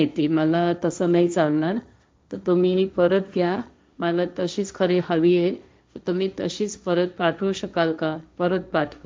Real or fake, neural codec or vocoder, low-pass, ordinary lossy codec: fake; codec, 16 kHz, 1.1 kbps, Voila-Tokenizer; none; none